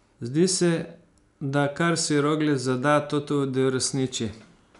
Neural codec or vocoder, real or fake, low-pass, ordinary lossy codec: none; real; 10.8 kHz; none